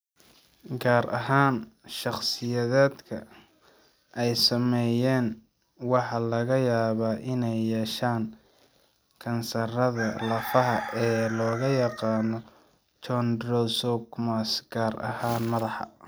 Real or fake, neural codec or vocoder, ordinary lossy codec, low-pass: real; none; none; none